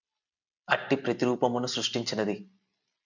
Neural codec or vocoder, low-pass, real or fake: none; 7.2 kHz; real